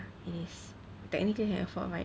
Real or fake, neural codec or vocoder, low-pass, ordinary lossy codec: real; none; none; none